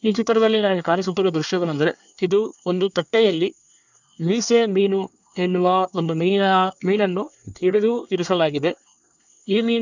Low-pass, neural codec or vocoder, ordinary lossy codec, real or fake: 7.2 kHz; codec, 24 kHz, 1 kbps, SNAC; none; fake